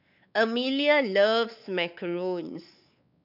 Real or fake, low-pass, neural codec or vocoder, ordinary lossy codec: fake; 5.4 kHz; codec, 16 kHz, 4 kbps, X-Codec, WavLM features, trained on Multilingual LibriSpeech; none